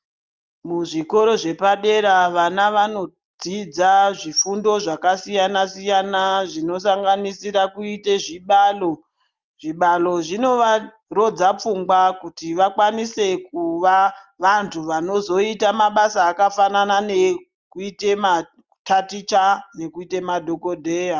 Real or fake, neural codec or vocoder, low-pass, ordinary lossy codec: real; none; 7.2 kHz; Opus, 32 kbps